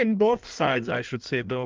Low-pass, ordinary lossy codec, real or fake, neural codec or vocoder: 7.2 kHz; Opus, 32 kbps; fake; codec, 16 kHz in and 24 kHz out, 1.1 kbps, FireRedTTS-2 codec